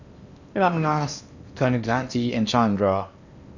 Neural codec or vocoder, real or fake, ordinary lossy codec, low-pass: codec, 16 kHz in and 24 kHz out, 0.8 kbps, FocalCodec, streaming, 65536 codes; fake; none; 7.2 kHz